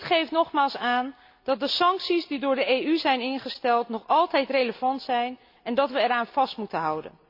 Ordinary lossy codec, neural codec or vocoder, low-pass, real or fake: MP3, 48 kbps; none; 5.4 kHz; real